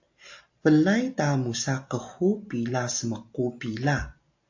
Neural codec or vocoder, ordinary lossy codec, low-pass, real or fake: none; AAC, 48 kbps; 7.2 kHz; real